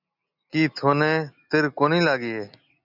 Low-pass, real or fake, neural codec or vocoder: 5.4 kHz; real; none